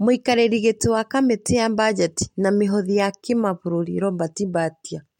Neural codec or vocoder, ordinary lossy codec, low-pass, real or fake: none; MP3, 64 kbps; 19.8 kHz; real